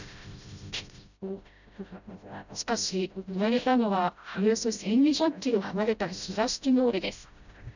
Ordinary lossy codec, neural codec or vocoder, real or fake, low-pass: none; codec, 16 kHz, 0.5 kbps, FreqCodec, smaller model; fake; 7.2 kHz